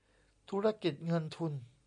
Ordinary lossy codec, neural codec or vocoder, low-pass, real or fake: MP3, 64 kbps; none; 9.9 kHz; real